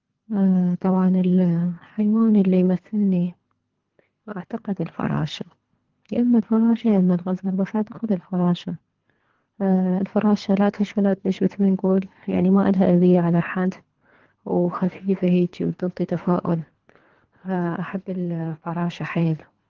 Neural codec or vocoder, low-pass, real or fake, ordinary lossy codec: codec, 24 kHz, 3 kbps, HILCodec; 7.2 kHz; fake; Opus, 16 kbps